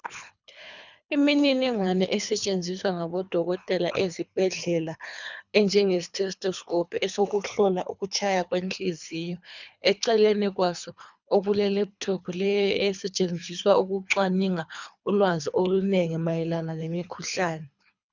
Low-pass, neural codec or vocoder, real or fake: 7.2 kHz; codec, 24 kHz, 3 kbps, HILCodec; fake